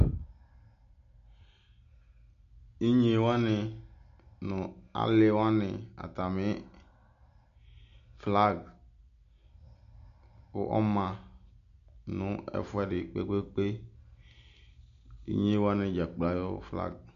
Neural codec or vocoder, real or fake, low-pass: none; real; 7.2 kHz